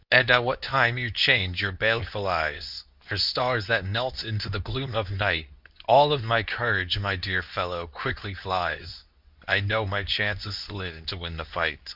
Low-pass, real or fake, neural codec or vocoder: 5.4 kHz; fake; codec, 24 kHz, 0.9 kbps, WavTokenizer, medium speech release version 2